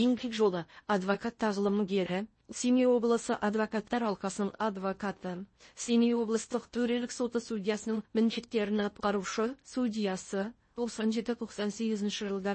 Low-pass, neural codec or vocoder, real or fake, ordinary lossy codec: 9.9 kHz; codec, 16 kHz in and 24 kHz out, 0.6 kbps, FocalCodec, streaming, 4096 codes; fake; MP3, 32 kbps